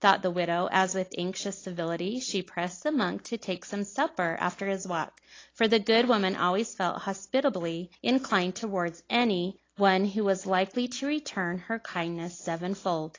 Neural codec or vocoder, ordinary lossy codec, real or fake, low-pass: none; AAC, 32 kbps; real; 7.2 kHz